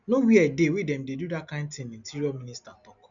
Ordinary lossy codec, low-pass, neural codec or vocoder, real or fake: MP3, 96 kbps; 7.2 kHz; none; real